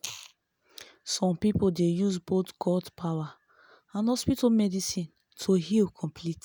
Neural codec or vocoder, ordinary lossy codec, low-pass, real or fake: none; none; none; real